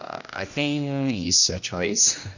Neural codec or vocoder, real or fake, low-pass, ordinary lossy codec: codec, 16 kHz, 1 kbps, X-Codec, HuBERT features, trained on general audio; fake; 7.2 kHz; none